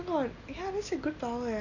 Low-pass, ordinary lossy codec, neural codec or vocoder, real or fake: 7.2 kHz; none; none; real